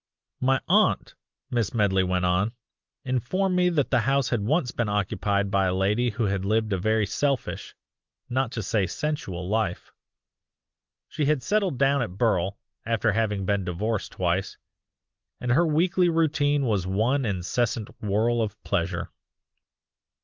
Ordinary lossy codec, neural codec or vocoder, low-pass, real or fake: Opus, 24 kbps; none; 7.2 kHz; real